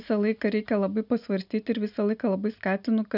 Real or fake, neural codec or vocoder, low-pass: real; none; 5.4 kHz